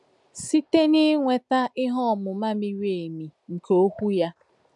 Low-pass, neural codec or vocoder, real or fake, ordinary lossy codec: 10.8 kHz; none; real; MP3, 96 kbps